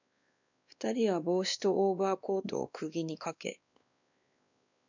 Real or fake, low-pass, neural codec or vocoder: fake; 7.2 kHz; codec, 16 kHz, 2 kbps, X-Codec, WavLM features, trained on Multilingual LibriSpeech